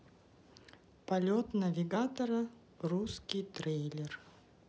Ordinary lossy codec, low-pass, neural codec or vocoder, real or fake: none; none; none; real